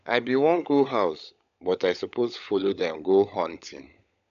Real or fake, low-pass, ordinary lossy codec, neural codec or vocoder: fake; 7.2 kHz; none; codec, 16 kHz, 16 kbps, FunCodec, trained on LibriTTS, 50 frames a second